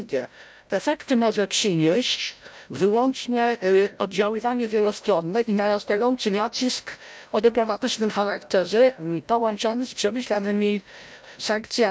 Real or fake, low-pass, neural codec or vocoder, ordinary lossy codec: fake; none; codec, 16 kHz, 0.5 kbps, FreqCodec, larger model; none